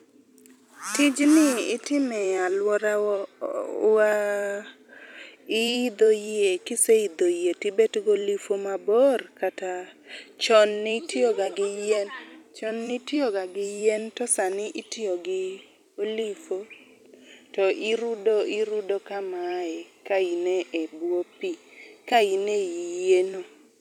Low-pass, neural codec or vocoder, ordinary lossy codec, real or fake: 19.8 kHz; vocoder, 44.1 kHz, 128 mel bands every 256 samples, BigVGAN v2; none; fake